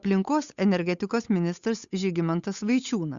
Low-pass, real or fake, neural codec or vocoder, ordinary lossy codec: 7.2 kHz; fake; codec, 16 kHz, 8 kbps, FunCodec, trained on Chinese and English, 25 frames a second; Opus, 64 kbps